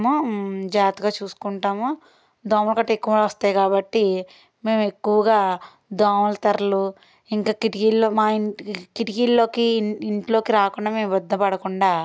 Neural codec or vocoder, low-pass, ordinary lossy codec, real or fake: none; none; none; real